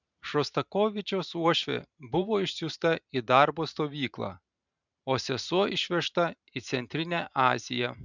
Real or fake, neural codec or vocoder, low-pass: fake; vocoder, 44.1 kHz, 128 mel bands every 512 samples, BigVGAN v2; 7.2 kHz